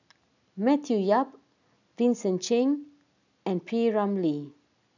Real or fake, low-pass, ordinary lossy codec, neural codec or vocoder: real; 7.2 kHz; none; none